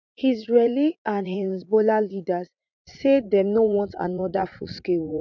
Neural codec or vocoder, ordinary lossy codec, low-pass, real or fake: vocoder, 44.1 kHz, 80 mel bands, Vocos; none; 7.2 kHz; fake